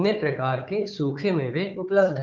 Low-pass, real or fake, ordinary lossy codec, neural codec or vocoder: 7.2 kHz; fake; Opus, 32 kbps; codec, 16 kHz, 4 kbps, FunCodec, trained on Chinese and English, 50 frames a second